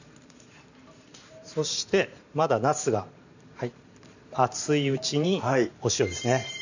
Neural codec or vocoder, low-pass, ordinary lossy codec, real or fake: vocoder, 44.1 kHz, 128 mel bands every 512 samples, BigVGAN v2; 7.2 kHz; none; fake